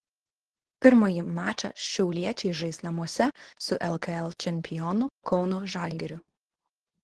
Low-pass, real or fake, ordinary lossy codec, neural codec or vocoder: 10.8 kHz; fake; Opus, 16 kbps; codec, 24 kHz, 0.9 kbps, WavTokenizer, medium speech release version 2